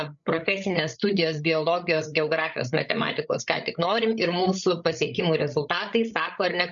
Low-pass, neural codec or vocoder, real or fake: 7.2 kHz; codec, 16 kHz, 8 kbps, FreqCodec, larger model; fake